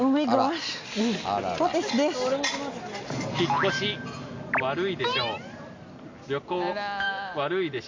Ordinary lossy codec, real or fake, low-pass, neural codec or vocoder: MP3, 64 kbps; real; 7.2 kHz; none